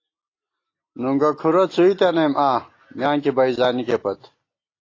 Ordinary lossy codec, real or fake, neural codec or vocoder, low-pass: AAC, 32 kbps; real; none; 7.2 kHz